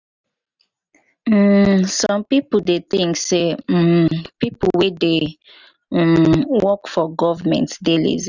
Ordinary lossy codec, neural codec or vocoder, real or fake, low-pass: none; none; real; 7.2 kHz